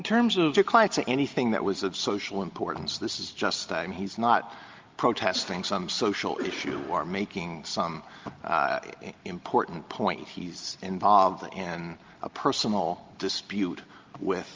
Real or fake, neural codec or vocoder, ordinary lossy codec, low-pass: real; none; Opus, 32 kbps; 7.2 kHz